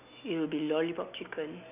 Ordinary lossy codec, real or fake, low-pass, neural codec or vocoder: none; real; 3.6 kHz; none